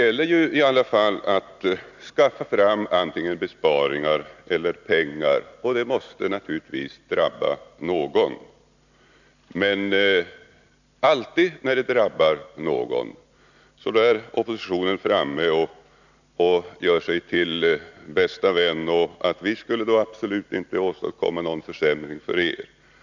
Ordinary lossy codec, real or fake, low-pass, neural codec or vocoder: none; real; 7.2 kHz; none